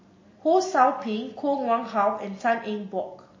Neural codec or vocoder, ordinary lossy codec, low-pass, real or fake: none; MP3, 32 kbps; 7.2 kHz; real